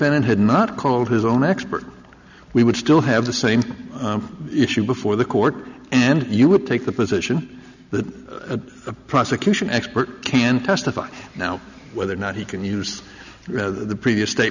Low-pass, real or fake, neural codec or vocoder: 7.2 kHz; real; none